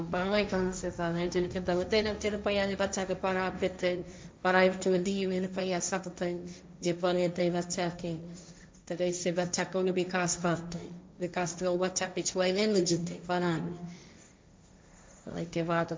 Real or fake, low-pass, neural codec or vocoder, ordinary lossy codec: fake; none; codec, 16 kHz, 1.1 kbps, Voila-Tokenizer; none